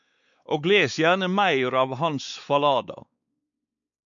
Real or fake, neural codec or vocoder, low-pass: fake; codec, 16 kHz, 4 kbps, X-Codec, WavLM features, trained on Multilingual LibriSpeech; 7.2 kHz